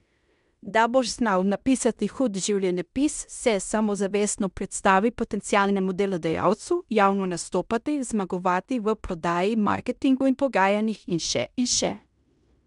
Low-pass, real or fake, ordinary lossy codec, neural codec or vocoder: 10.8 kHz; fake; MP3, 96 kbps; codec, 16 kHz in and 24 kHz out, 0.9 kbps, LongCat-Audio-Codec, fine tuned four codebook decoder